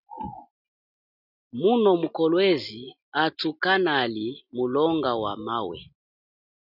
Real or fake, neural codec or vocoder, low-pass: real; none; 5.4 kHz